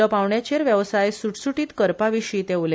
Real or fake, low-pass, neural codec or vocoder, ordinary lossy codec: real; none; none; none